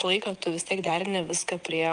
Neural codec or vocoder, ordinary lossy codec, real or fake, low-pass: vocoder, 22.05 kHz, 80 mel bands, WaveNeXt; Opus, 32 kbps; fake; 9.9 kHz